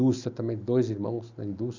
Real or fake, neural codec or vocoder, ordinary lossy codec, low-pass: real; none; none; 7.2 kHz